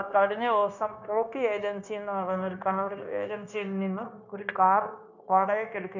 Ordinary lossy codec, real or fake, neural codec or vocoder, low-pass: none; fake; codec, 16 kHz, 0.9 kbps, LongCat-Audio-Codec; 7.2 kHz